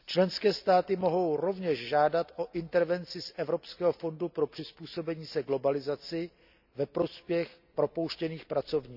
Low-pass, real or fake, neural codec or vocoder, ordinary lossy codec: 5.4 kHz; real; none; none